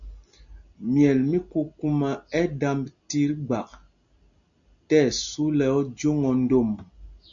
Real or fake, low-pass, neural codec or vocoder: real; 7.2 kHz; none